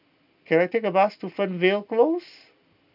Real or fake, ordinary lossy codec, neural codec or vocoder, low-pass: real; none; none; 5.4 kHz